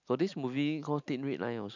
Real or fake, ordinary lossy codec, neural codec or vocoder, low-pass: real; none; none; 7.2 kHz